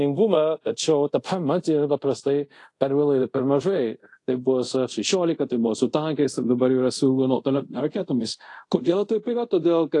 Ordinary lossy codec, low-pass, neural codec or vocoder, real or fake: AAC, 48 kbps; 10.8 kHz; codec, 24 kHz, 0.5 kbps, DualCodec; fake